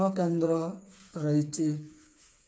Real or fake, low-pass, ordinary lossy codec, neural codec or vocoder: fake; none; none; codec, 16 kHz, 4 kbps, FreqCodec, smaller model